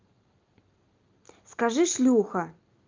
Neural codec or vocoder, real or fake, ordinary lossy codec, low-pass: none; real; Opus, 24 kbps; 7.2 kHz